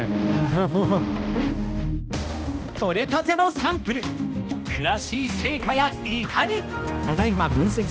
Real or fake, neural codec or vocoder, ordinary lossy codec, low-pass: fake; codec, 16 kHz, 1 kbps, X-Codec, HuBERT features, trained on balanced general audio; none; none